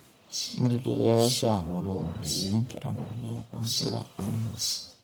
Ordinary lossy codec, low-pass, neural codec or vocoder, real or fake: none; none; codec, 44.1 kHz, 1.7 kbps, Pupu-Codec; fake